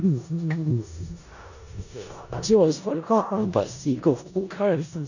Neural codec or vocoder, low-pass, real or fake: codec, 16 kHz in and 24 kHz out, 0.4 kbps, LongCat-Audio-Codec, four codebook decoder; 7.2 kHz; fake